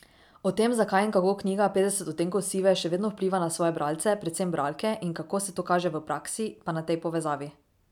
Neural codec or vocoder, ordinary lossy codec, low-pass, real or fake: none; none; 19.8 kHz; real